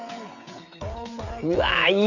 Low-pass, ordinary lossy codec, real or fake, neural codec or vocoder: 7.2 kHz; none; fake; codec, 16 kHz, 16 kbps, FreqCodec, smaller model